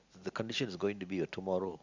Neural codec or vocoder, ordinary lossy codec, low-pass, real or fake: none; Opus, 64 kbps; 7.2 kHz; real